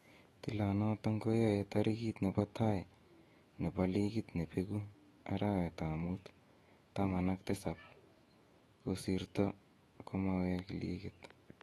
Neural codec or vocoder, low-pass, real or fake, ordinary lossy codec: autoencoder, 48 kHz, 128 numbers a frame, DAC-VAE, trained on Japanese speech; 19.8 kHz; fake; AAC, 32 kbps